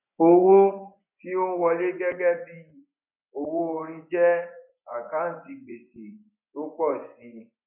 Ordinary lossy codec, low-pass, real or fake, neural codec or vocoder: none; 3.6 kHz; fake; vocoder, 24 kHz, 100 mel bands, Vocos